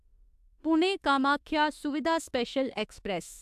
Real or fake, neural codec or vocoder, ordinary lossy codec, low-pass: fake; autoencoder, 48 kHz, 32 numbers a frame, DAC-VAE, trained on Japanese speech; AAC, 96 kbps; 14.4 kHz